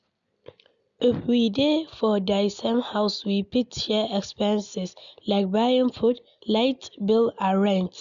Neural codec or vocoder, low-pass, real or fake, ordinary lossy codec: none; 7.2 kHz; real; none